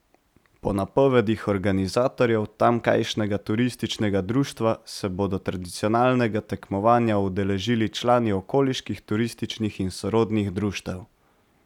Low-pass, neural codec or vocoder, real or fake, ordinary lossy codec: 19.8 kHz; none; real; none